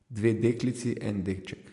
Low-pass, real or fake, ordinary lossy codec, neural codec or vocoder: 10.8 kHz; real; MP3, 64 kbps; none